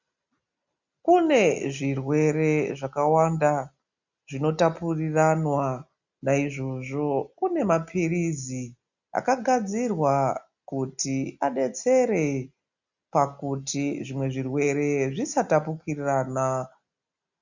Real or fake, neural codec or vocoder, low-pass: real; none; 7.2 kHz